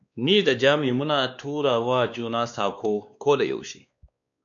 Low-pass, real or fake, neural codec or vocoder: 7.2 kHz; fake; codec, 16 kHz, 2 kbps, X-Codec, WavLM features, trained on Multilingual LibriSpeech